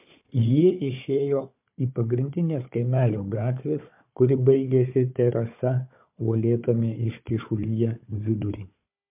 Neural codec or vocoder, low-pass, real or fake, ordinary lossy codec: codec, 16 kHz, 4 kbps, FunCodec, trained on Chinese and English, 50 frames a second; 3.6 kHz; fake; MP3, 32 kbps